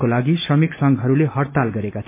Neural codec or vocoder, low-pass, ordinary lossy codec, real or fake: none; 3.6 kHz; MP3, 32 kbps; real